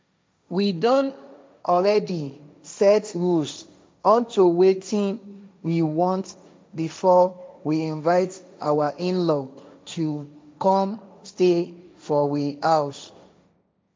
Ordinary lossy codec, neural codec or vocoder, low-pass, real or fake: none; codec, 16 kHz, 1.1 kbps, Voila-Tokenizer; none; fake